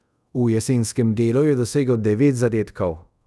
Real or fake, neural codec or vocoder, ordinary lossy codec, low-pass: fake; codec, 24 kHz, 0.5 kbps, DualCodec; none; none